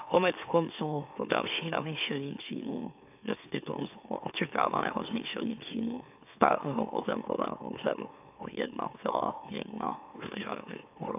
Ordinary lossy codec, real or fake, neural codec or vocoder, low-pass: AAC, 32 kbps; fake; autoencoder, 44.1 kHz, a latent of 192 numbers a frame, MeloTTS; 3.6 kHz